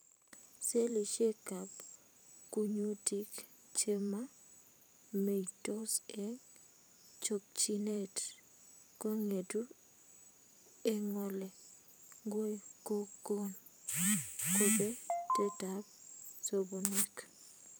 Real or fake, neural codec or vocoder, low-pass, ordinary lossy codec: fake; vocoder, 44.1 kHz, 128 mel bands every 512 samples, BigVGAN v2; none; none